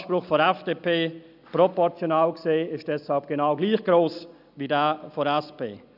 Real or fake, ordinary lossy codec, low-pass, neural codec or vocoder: real; none; 5.4 kHz; none